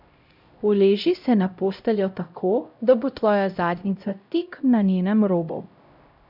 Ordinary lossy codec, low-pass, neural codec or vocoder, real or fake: none; 5.4 kHz; codec, 16 kHz, 0.5 kbps, X-Codec, HuBERT features, trained on LibriSpeech; fake